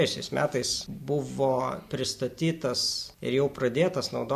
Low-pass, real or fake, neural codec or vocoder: 14.4 kHz; real; none